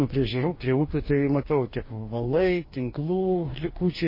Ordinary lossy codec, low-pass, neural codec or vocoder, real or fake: MP3, 24 kbps; 5.4 kHz; codec, 44.1 kHz, 2.6 kbps, DAC; fake